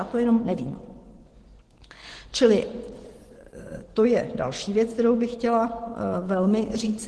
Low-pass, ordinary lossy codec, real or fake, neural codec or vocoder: 10.8 kHz; Opus, 16 kbps; fake; vocoder, 24 kHz, 100 mel bands, Vocos